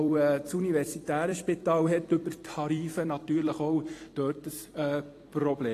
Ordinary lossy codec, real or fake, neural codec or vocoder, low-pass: AAC, 48 kbps; fake; vocoder, 44.1 kHz, 128 mel bands every 256 samples, BigVGAN v2; 14.4 kHz